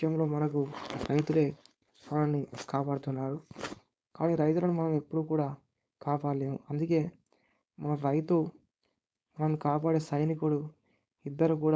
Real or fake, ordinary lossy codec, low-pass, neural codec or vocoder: fake; none; none; codec, 16 kHz, 4.8 kbps, FACodec